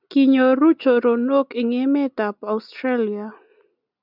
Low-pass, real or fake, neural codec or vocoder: 5.4 kHz; real; none